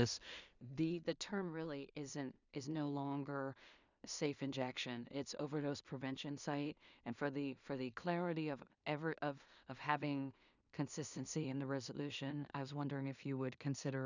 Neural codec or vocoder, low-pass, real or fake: codec, 16 kHz in and 24 kHz out, 0.4 kbps, LongCat-Audio-Codec, two codebook decoder; 7.2 kHz; fake